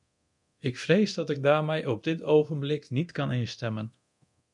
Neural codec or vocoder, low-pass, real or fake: codec, 24 kHz, 0.9 kbps, DualCodec; 10.8 kHz; fake